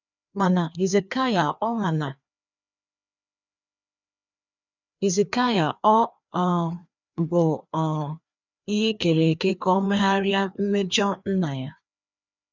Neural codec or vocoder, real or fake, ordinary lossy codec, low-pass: codec, 16 kHz, 2 kbps, FreqCodec, larger model; fake; none; 7.2 kHz